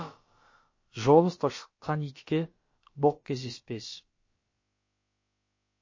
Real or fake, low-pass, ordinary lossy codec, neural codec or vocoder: fake; 7.2 kHz; MP3, 32 kbps; codec, 16 kHz, about 1 kbps, DyCAST, with the encoder's durations